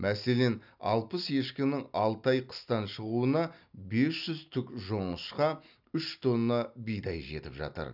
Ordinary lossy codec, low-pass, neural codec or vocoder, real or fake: none; 5.4 kHz; none; real